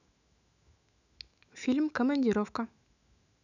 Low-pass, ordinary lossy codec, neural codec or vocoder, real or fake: 7.2 kHz; none; autoencoder, 48 kHz, 128 numbers a frame, DAC-VAE, trained on Japanese speech; fake